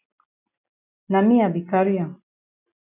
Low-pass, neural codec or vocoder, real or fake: 3.6 kHz; none; real